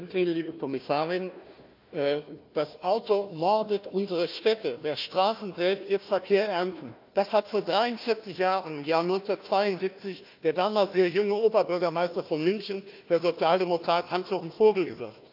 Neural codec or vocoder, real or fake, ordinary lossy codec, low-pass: codec, 16 kHz, 1 kbps, FunCodec, trained on Chinese and English, 50 frames a second; fake; AAC, 48 kbps; 5.4 kHz